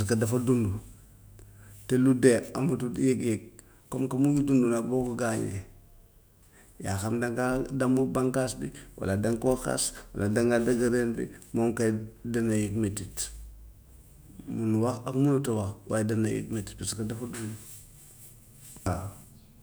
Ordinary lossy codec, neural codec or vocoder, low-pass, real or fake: none; autoencoder, 48 kHz, 128 numbers a frame, DAC-VAE, trained on Japanese speech; none; fake